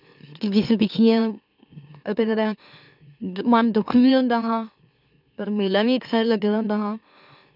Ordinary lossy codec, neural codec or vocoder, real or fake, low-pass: none; autoencoder, 44.1 kHz, a latent of 192 numbers a frame, MeloTTS; fake; 5.4 kHz